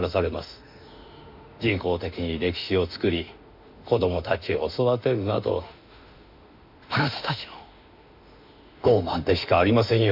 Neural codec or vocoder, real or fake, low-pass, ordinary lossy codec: autoencoder, 48 kHz, 32 numbers a frame, DAC-VAE, trained on Japanese speech; fake; 5.4 kHz; MP3, 48 kbps